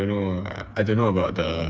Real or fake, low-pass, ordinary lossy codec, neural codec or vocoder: fake; none; none; codec, 16 kHz, 4 kbps, FreqCodec, smaller model